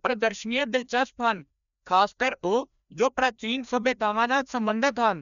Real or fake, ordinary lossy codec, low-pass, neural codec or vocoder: fake; none; 7.2 kHz; codec, 16 kHz, 1 kbps, FreqCodec, larger model